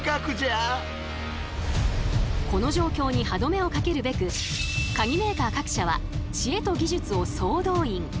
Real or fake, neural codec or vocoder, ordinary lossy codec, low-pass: real; none; none; none